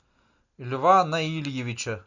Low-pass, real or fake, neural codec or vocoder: 7.2 kHz; real; none